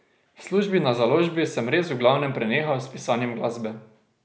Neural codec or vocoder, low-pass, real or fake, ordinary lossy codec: none; none; real; none